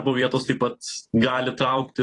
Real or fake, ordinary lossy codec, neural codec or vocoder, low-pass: fake; AAC, 32 kbps; vocoder, 44.1 kHz, 128 mel bands every 256 samples, BigVGAN v2; 10.8 kHz